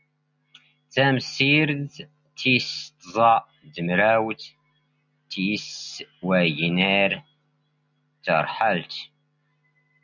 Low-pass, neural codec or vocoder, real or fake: 7.2 kHz; none; real